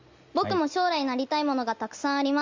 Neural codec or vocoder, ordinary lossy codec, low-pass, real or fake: none; Opus, 32 kbps; 7.2 kHz; real